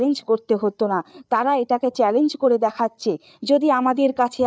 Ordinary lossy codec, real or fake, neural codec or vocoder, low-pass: none; fake; codec, 16 kHz, 8 kbps, FreqCodec, larger model; none